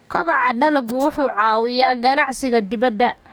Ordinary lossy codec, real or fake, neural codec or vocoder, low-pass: none; fake; codec, 44.1 kHz, 2.6 kbps, DAC; none